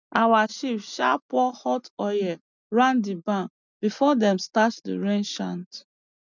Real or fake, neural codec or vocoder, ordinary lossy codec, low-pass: real; none; none; 7.2 kHz